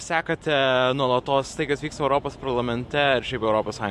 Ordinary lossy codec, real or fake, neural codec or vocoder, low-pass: MP3, 64 kbps; fake; vocoder, 44.1 kHz, 128 mel bands every 512 samples, BigVGAN v2; 14.4 kHz